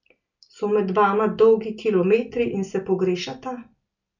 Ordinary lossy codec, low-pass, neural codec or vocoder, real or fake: none; 7.2 kHz; none; real